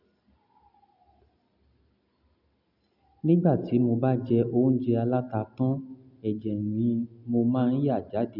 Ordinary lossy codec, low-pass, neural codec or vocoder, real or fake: none; 5.4 kHz; none; real